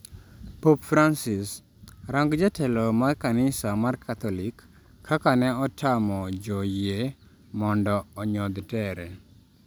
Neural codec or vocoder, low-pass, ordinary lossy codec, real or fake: none; none; none; real